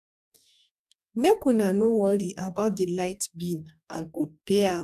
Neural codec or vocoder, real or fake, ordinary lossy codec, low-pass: codec, 44.1 kHz, 2.6 kbps, DAC; fake; none; 14.4 kHz